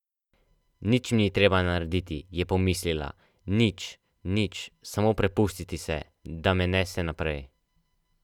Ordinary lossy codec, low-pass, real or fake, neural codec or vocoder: none; 19.8 kHz; real; none